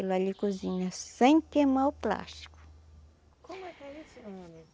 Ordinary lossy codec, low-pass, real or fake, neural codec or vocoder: none; none; real; none